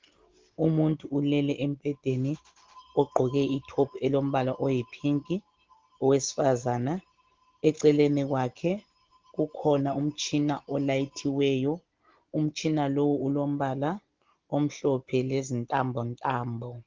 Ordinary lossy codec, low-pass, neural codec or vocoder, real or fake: Opus, 16 kbps; 7.2 kHz; none; real